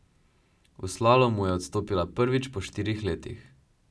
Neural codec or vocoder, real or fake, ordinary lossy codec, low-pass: none; real; none; none